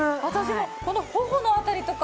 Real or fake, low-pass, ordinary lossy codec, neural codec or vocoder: real; none; none; none